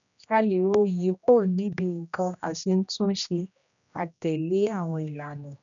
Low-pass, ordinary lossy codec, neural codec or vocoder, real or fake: 7.2 kHz; none; codec, 16 kHz, 1 kbps, X-Codec, HuBERT features, trained on general audio; fake